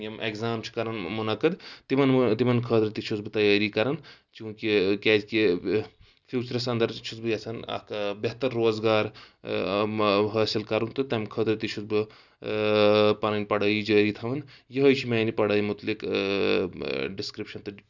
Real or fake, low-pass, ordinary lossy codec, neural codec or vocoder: real; 7.2 kHz; none; none